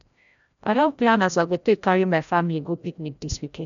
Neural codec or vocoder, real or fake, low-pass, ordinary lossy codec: codec, 16 kHz, 0.5 kbps, FreqCodec, larger model; fake; 7.2 kHz; none